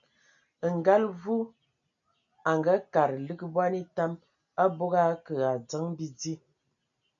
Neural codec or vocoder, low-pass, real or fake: none; 7.2 kHz; real